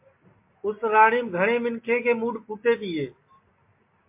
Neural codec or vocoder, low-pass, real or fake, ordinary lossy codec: none; 3.6 kHz; real; MP3, 24 kbps